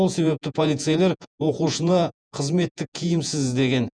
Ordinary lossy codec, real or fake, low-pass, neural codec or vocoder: none; fake; 9.9 kHz; vocoder, 48 kHz, 128 mel bands, Vocos